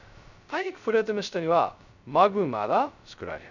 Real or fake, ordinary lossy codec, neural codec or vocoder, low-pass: fake; none; codec, 16 kHz, 0.2 kbps, FocalCodec; 7.2 kHz